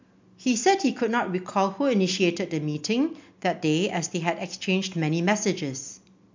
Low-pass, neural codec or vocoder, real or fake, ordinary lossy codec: 7.2 kHz; none; real; MP3, 64 kbps